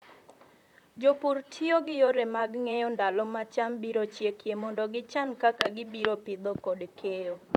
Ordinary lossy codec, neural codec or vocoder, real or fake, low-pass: none; vocoder, 44.1 kHz, 128 mel bands, Pupu-Vocoder; fake; 19.8 kHz